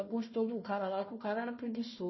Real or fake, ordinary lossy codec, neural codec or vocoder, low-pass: fake; MP3, 24 kbps; codec, 16 kHz, 1 kbps, FunCodec, trained on Chinese and English, 50 frames a second; 7.2 kHz